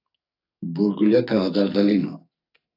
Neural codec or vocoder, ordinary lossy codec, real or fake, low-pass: codec, 44.1 kHz, 2.6 kbps, SNAC; AAC, 32 kbps; fake; 5.4 kHz